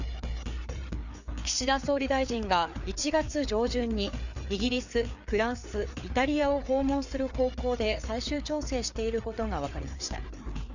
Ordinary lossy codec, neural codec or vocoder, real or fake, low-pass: none; codec, 16 kHz, 4 kbps, FreqCodec, larger model; fake; 7.2 kHz